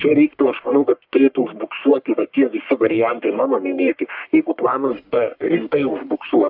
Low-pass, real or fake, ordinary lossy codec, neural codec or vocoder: 5.4 kHz; fake; AAC, 48 kbps; codec, 44.1 kHz, 1.7 kbps, Pupu-Codec